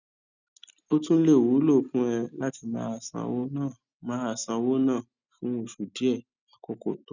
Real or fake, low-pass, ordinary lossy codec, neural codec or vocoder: real; 7.2 kHz; none; none